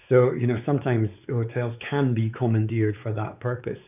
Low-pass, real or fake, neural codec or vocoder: 3.6 kHz; fake; codec, 16 kHz, 4 kbps, X-Codec, WavLM features, trained on Multilingual LibriSpeech